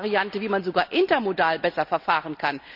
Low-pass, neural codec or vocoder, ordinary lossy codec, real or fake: 5.4 kHz; none; none; real